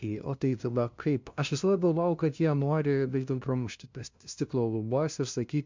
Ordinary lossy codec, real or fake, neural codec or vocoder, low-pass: MP3, 64 kbps; fake; codec, 16 kHz, 0.5 kbps, FunCodec, trained on LibriTTS, 25 frames a second; 7.2 kHz